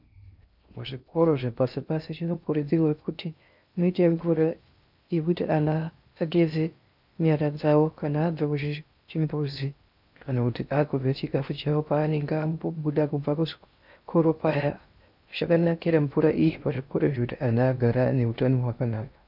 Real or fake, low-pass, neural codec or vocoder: fake; 5.4 kHz; codec, 16 kHz in and 24 kHz out, 0.6 kbps, FocalCodec, streaming, 2048 codes